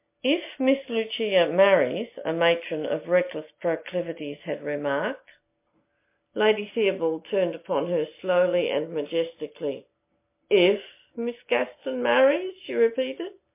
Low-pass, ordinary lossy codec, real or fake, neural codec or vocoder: 3.6 kHz; MP3, 24 kbps; real; none